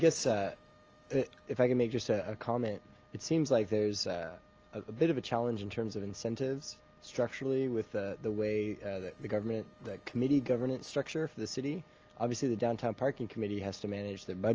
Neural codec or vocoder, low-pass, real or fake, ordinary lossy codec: none; 7.2 kHz; real; Opus, 24 kbps